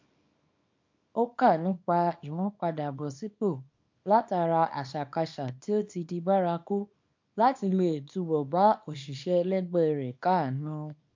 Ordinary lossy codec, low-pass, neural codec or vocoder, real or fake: MP3, 48 kbps; 7.2 kHz; codec, 24 kHz, 0.9 kbps, WavTokenizer, small release; fake